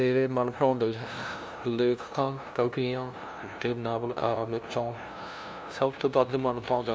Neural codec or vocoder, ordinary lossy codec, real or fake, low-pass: codec, 16 kHz, 0.5 kbps, FunCodec, trained on LibriTTS, 25 frames a second; none; fake; none